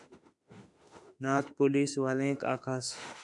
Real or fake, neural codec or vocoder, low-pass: fake; autoencoder, 48 kHz, 32 numbers a frame, DAC-VAE, trained on Japanese speech; 10.8 kHz